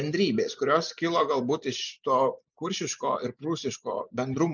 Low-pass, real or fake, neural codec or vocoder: 7.2 kHz; real; none